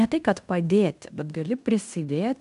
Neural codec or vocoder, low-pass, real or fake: codec, 16 kHz in and 24 kHz out, 0.9 kbps, LongCat-Audio-Codec, fine tuned four codebook decoder; 10.8 kHz; fake